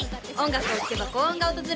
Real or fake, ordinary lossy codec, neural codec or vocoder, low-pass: real; none; none; none